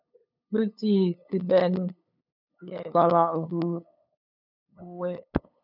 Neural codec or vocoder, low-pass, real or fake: codec, 16 kHz, 8 kbps, FunCodec, trained on LibriTTS, 25 frames a second; 5.4 kHz; fake